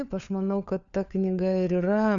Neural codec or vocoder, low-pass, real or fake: codec, 16 kHz, 4 kbps, FunCodec, trained on LibriTTS, 50 frames a second; 7.2 kHz; fake